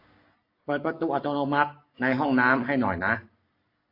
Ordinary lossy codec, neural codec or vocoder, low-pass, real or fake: AAC, 32 kbps; none; 5.4 kHz; real